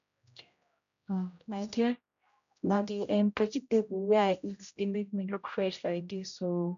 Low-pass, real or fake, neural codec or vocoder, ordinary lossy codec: 7.2 kHz; fake; codec, 16 kHz, 0.5 kbps, X-Codec, HuBERT features, trained on general audio; none